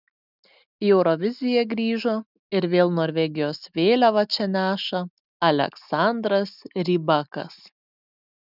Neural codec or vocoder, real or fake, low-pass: none; real; 5.4 kHz